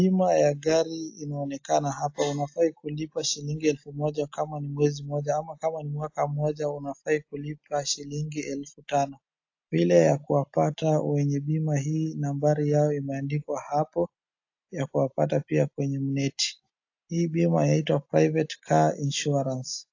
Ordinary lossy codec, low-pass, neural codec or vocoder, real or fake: AAC, 48 kbps; 7.2 kHz; none; real